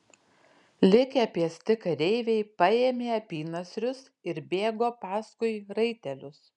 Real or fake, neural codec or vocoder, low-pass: real; none; 10.8 kHz